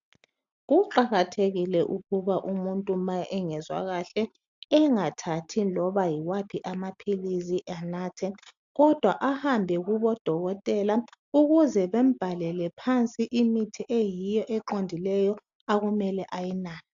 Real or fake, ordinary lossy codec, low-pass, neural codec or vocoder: real; AAC, 64 kbps; 7.2 kHz; none